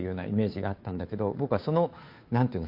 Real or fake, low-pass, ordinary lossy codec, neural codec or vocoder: fake; 5.4 kHz; MP3, 32 kbps; codec, 16 kHz, 2 kbps, FunCodec, trained on Chinese and English, 25 frames a second